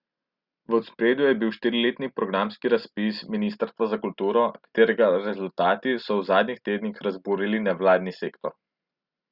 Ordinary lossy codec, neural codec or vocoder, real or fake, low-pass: Opus, 64 kbps; none; real; 5.4 kHz